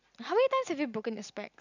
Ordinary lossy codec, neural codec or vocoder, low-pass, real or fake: none; none; 7.2 kHz; real